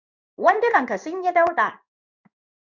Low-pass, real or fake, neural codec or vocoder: 7.2 kHz; fake; codec, 16 kHz in and 24 kHz out, 1 kbps, XY-Tokenizer